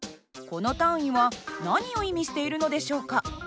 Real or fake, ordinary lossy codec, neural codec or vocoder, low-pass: real; none; none; none